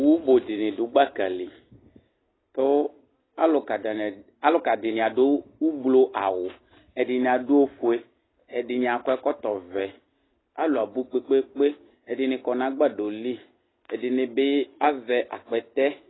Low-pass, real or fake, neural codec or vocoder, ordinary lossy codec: 7.2 kHz; real; none; AAC, 16 kbps